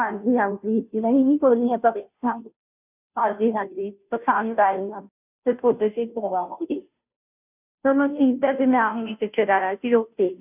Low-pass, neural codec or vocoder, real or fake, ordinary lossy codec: 3.6 kHz; codec, 16 kHz, 0.5 kbps, FunCodec, trained on Chinese and English, 25 frames a second; fake; none